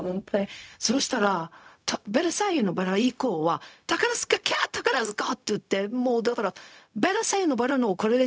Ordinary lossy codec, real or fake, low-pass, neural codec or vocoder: none; fake; none; codec, 16 kHz, 0.4 kbps, LongCat-Audio-Codec